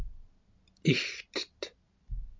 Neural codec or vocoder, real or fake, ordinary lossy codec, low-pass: none; real; MP3, 64 kbps; 7.2 kHz